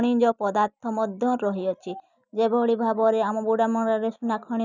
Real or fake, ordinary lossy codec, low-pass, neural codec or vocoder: real; none; 7.2 kHz; none